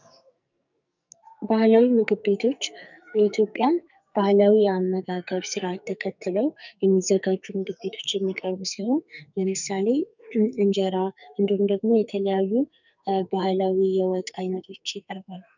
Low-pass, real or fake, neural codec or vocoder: 7.2 kHz; fake; codec, 44.1 kHz, 2.6 kbps, SNAC